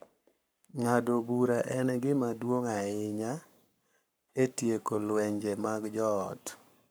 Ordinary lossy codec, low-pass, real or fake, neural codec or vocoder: none; none; fake; codec, 44.1 kHz, 7.8 kbps, Pupu-Codec